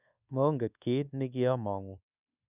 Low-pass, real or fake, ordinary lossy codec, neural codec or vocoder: 3.6 kHz; fake; none; codec, 24 kHz, 1.2 kbps, DualCodec